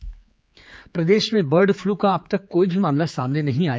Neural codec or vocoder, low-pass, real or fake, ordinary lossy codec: codec, 16 kHz, 4 kbps, X-Codec, HuBERT features, trained on general audio; none; fake; none